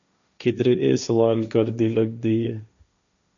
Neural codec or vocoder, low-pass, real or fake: codec, 16 kHz, 1.1 kbps, Voila-Tokenizer; 7.2 kHz; fake